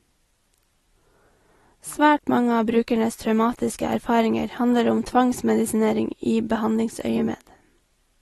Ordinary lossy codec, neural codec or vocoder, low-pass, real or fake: AAC, 32 kbps; none; 19.8 kHz; real